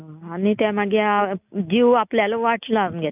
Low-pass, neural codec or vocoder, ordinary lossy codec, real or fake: 3.6 kHz; none; none; real